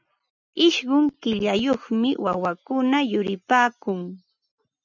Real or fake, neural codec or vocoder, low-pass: real; none; 7.2 kHz